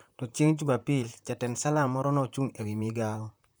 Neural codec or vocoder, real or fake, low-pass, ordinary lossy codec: vocoder, 44.1 kHz, 128 mel bands, Pupu-Vocoder; fake; none; none